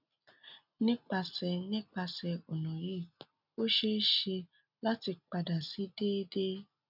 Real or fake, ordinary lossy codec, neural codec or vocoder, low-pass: real; none; none; 5.4 kHz